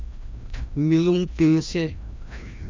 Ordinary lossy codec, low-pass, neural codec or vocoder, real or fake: MP3, 64 kbps; 7.2 kHz; codec, 16 kHz, 1 kbps, FreqCodec, larger model; fake